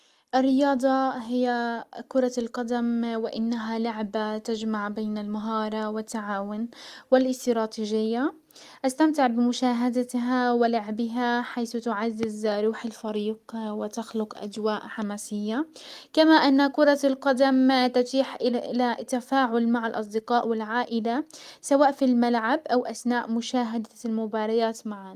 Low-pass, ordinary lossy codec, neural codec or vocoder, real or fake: 14.4 kHz; Opus, 32 kbps; none; real